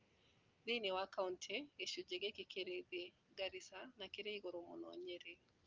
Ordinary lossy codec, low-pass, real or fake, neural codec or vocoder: Opus, 24 kbps; 7.2 kHz; real; none